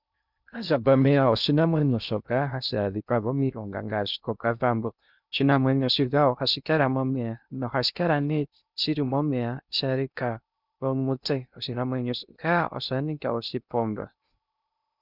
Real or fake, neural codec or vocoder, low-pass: fake; codec, 16 kHz in and 24 kHz out, 0.6 kbps, FocalCodec, streaming, 2048 codes; 5.4 kHz